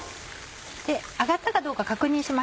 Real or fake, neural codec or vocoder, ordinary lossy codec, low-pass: real; none; none; none